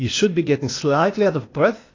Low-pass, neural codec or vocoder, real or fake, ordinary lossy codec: 7.2 kHz; codec, 16 kHz, 0.8 kbps, ZipCodec; fake; AAC, 32 kbps